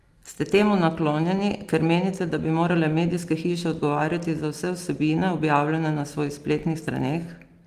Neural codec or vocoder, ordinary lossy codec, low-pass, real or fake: none; Opus, 24 kbps; 14.4 kHz; real